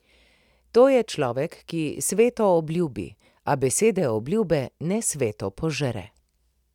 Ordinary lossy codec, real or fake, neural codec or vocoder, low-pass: none; real; none; 19.8 kHz